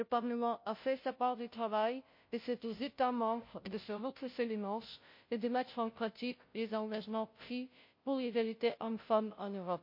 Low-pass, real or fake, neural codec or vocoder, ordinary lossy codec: 5.4 kHz; fake; codec, 16 kHz, 0.5 kbps, FunCodec, trained on Chinese and English, 25 frames a second; MP3, 32 kbps